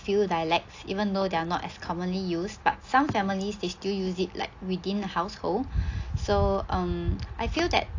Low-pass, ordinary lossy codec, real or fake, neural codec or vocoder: 7.2 kHz; AAC, 48 kbps; real; none